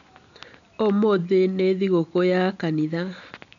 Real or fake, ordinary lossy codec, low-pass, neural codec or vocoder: real; none; 7.2 kHz; none